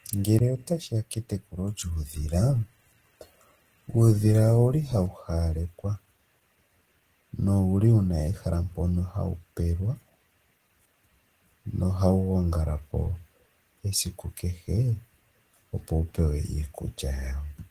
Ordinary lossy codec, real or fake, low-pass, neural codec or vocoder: Opus, 16 kbps; real; 14.4 kHz; none